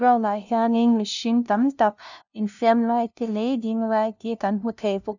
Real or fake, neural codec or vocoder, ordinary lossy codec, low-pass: fake; codec, 16 kHz, 0.5 kbps, FunCodec, trained on LibriTTS, 25 frames a second; none; 7.2 kHz